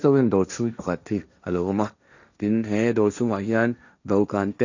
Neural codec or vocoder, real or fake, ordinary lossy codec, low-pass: codec, 16 kHz, 1.1 kbps, Voila-Tokenizer; fake; none; 7.2 kHz